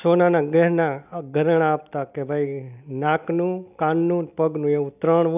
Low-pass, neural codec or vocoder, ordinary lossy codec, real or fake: 3.6 kHz; none; none; real